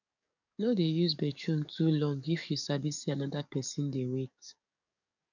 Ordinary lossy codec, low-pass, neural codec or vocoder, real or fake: none; 7.2 kHz; codec, 44.1 kHz, 7.8 kbps, DAC; fake